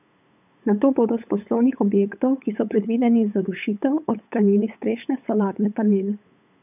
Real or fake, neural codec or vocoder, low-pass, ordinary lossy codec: fake; codec, 16 kHz, 8 kbps, FunCodec, trained on LibriTTS, 25 frames a second; 3.6 kHz; none